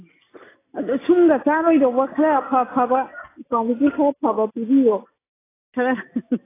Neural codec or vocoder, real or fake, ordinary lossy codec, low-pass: none; real; AAC, 16 kbps; 3.6 kHz